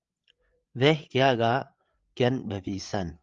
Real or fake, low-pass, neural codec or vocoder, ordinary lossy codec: fake; 7.2 kHz; codec, 16 kHz, 8 kbps, FreqCodec, larger model; Opus, 32 kbps